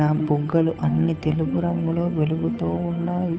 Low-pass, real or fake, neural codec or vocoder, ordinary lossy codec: none; fake; codec, 16 kHz, 8 kbps, FunCodec, trained on Chinese and English, 25 frames a second; none